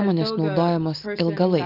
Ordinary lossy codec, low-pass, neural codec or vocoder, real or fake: Opus, 24 kbps; 5.4 kHz; none; real